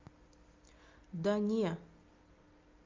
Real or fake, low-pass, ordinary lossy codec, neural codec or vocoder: real; 7.2 kHz; Opus, 24 kbps; none